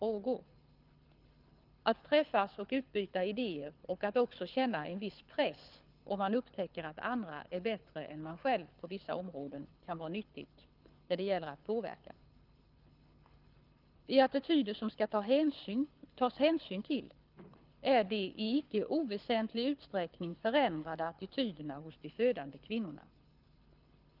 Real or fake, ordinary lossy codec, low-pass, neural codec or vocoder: fake; Opus, 24 kbps; 5.4 kHz; codec, 24 kHz, 6 kbps, HILCodec